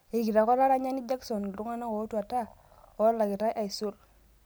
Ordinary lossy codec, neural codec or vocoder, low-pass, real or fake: none; none; none; real